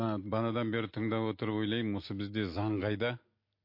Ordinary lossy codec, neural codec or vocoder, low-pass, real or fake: MP3, 32 kbps; none; 5.4 kHz; real